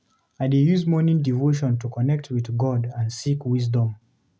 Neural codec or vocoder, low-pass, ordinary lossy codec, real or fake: none; none; none; real